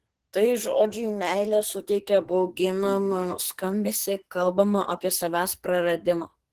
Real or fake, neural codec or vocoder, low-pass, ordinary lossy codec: fake; codec, 44.1 kHz, 2.6 kbps, SNAC; 14.4 kHz; Opus, 16 kbps